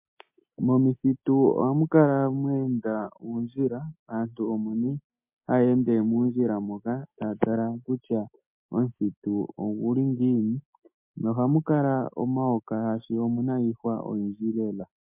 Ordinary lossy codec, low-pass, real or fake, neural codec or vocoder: MP3, 32 kbps; 3.6 kHz; real; none